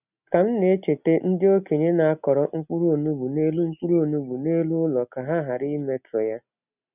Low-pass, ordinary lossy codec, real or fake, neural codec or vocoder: 3.6 kHz; none; real; none